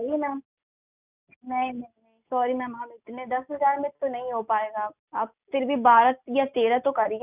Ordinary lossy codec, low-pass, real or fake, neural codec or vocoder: none; 3.6 kHz; real; none